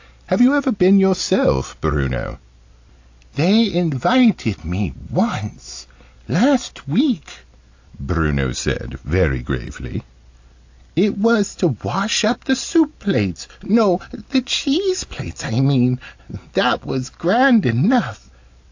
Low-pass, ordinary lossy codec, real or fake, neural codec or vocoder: 7.2 kHz; AAC, 48 kbps; real; none